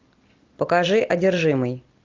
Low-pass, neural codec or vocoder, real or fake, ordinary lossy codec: 7.2 kHz; none; real; Opus, 24 kbps